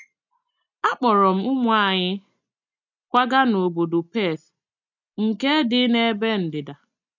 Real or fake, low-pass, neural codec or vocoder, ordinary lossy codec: real; 7.2 kHz; none; none